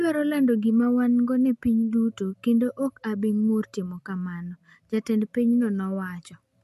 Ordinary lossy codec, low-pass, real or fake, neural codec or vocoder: MP3, 64 kbps; 14.4 kHz; real; none